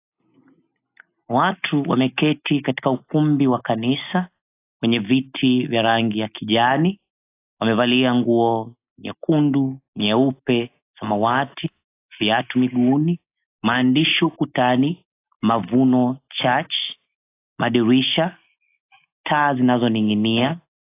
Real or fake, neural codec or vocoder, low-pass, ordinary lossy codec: real; none; 3.6 kHz; AAC, 24 kbps